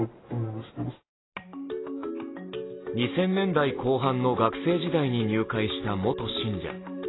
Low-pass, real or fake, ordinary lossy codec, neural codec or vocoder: 7.2 kHz; real; AAC, 16 kbps; none